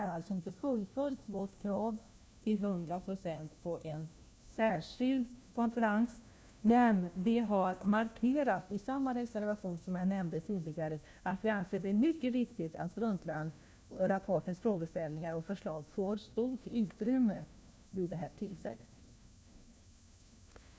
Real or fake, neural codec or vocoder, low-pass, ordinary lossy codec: fake; codec, 16 kHz, 1 kbps, FunCodec, trained on LibriTTS, 50 frames a second; none; none